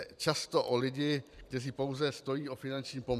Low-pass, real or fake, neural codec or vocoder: 14.4 kHz; fake; vocoder, 44.1 kHz, 128 mel bands every 512 samples, BigVGAN v2